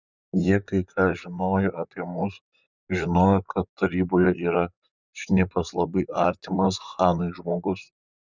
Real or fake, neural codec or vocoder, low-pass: fake; vocoder, 44.1 kHz, 128 mel bands, Pupu-Vocoder; 7.2 kHz